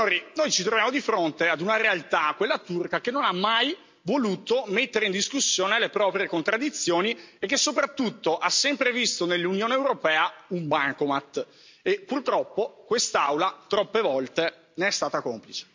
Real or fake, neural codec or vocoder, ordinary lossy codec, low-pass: real; none; MP3, 64 kbps; 7.2 kHz